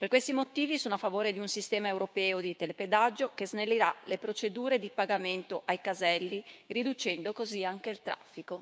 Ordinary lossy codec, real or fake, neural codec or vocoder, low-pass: none; fake; codec, 16 kHz, 6 kbps, DAC; none